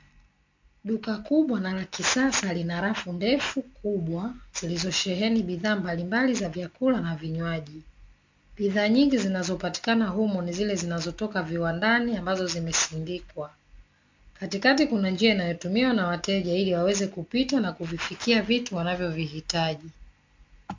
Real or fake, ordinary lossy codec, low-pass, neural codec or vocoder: real; MP3, 48 kbps; 7.2 kHz; none